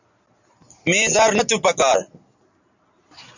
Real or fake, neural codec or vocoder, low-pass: real; none; 7.2 kHz